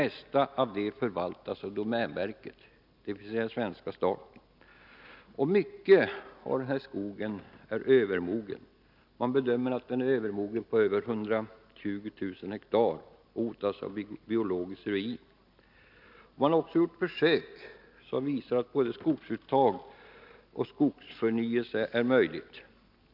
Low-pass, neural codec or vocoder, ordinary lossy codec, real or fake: 5.4 kHz; none; none; real